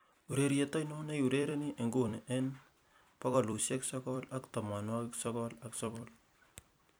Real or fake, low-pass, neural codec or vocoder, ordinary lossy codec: fake; none; vocoder, 44.1 kHz, 128 mel bands every 256 samples, BigVGAN v2; none